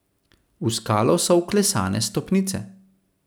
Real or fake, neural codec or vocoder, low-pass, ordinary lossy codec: real; none; none; none